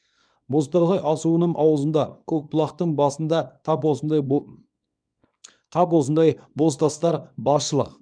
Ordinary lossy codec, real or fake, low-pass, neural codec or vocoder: none; fake; 9.9 kHz; codec, 24 kHz, 0.9 kbps, WavTokenizer, small release